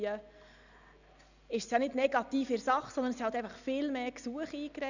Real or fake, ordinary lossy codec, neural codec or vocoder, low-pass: real; none; none; 7.2 kHz